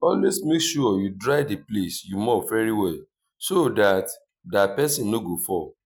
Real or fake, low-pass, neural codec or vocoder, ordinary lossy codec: real; none; none; none